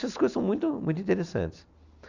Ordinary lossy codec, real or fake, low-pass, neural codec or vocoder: none; real; 7.2 kHz; none